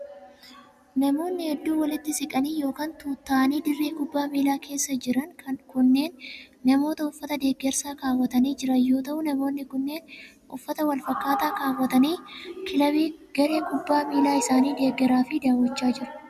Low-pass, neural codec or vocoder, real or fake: 14.4 kHz; none; real